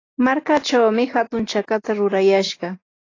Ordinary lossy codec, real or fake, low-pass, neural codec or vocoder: AAC, 32 kbps; real; 7.2 kHz; none